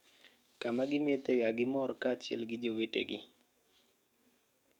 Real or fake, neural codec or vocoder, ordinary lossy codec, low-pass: fake; codec, 44.1 kHz, 7.8 kbps, DAC; none; 19.8 kHz